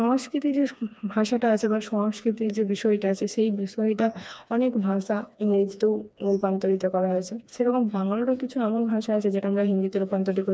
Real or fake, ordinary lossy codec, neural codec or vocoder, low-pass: fake; none; codec, 16 kHz, 2 kbps, FreqCodec, smaller model; none